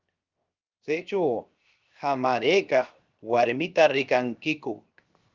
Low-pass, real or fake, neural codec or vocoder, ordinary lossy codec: 7.2 kHz; fake; codec, 16 kHz, 0.7 kbps, FocalCodec; Opus, 24 kbps